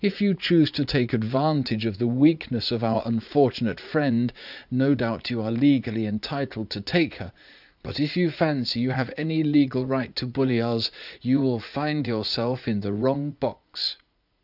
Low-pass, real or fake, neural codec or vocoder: 5.4 kHz; fake; vocoder, 44.1 kHz, 80 mel bands, Vocos